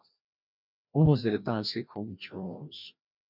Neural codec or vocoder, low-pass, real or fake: codec, 16 kHz, 1 kbps, FreqCodec, larger model; 5.4 kHz; fake